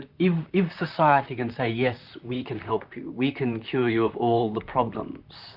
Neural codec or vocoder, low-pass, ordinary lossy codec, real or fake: vocoder, 44.1 kHz, 128 mel bands, Pupu-Vocoder; 5.4 kHz; Opus, 64 kbps; fake